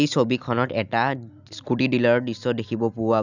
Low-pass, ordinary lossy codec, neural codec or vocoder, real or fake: 7.2 kHz; none; none; real